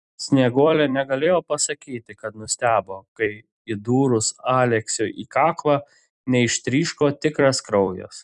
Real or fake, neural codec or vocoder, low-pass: fake; vocoder, 44.1 kHz, 128 mel bands every 256 samples, BigVGAN v2; 10.8 kHz